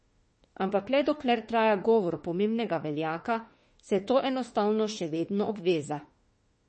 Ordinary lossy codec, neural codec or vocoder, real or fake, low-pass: MP3, 32 kbps; autoencoder, 48 kHz, 32 numbers a frame, DAC-VAE, trained on Japanese speech; fake; 10.8 kHz